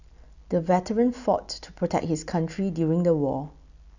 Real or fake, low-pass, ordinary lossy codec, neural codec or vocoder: real; 7.2 kHz; none; none